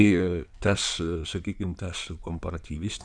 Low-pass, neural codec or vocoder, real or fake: 9.9 kHz; codec, 16 kHz in and 24 kHz out, 2.2 kbps, FireRedTTS-2 codec; fake